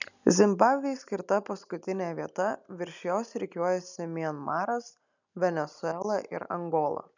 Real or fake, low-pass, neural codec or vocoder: real; 7.2 kHz; none